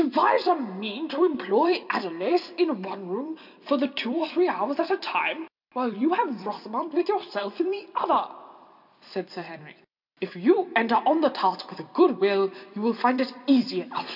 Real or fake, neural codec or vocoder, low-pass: fake; vocoder, 44.1 kHz, 128 mel bands every 256 samples, BigVGAN v2; 5.4 kHz